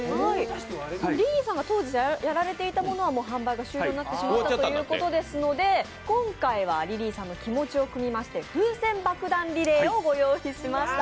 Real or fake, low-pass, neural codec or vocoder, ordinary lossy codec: real; none; none; none